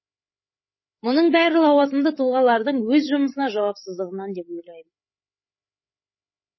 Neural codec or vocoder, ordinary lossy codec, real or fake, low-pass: codec, 16 kHz, 8 kbps, FreqCodec, larger model; MP3, 24 kbps; fake; 7.2 kHz